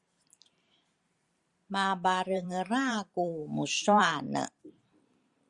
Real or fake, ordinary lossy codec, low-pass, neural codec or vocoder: fake; Opus, 64 kbps; 10.8 kHz; vocoder, 44.1 kHz, 128 mel bands every 512 samples, BigVGAN v2